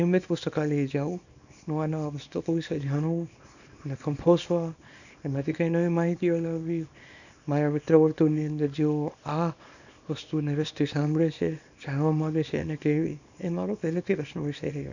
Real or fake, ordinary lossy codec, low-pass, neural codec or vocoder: fake; none; 7.2 kHz; codec, 24 kHz, 0.9 kbps, WavTokenizer, small release